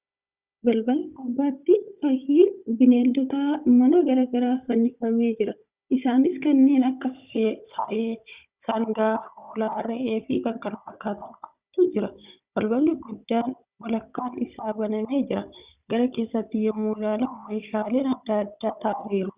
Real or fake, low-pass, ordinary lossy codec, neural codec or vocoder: fake; 3.6 kHz; Opus, 64 kbps; codec, 16 kHz, 16 kbps, FunCodec, trained on Chinese and English, 50 frames a second